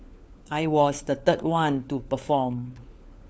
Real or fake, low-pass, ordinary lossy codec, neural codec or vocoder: fake; none; none; codec, 16 kHz, 4 kbps, FunCodec, trained on LibriTTS, 50 frames a second